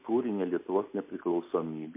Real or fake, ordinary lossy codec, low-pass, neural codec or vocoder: real; MP3, 24 kbps; 3.6 kHz; none